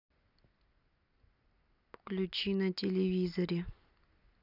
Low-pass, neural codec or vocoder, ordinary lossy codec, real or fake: 5.4 kHz; none; none; real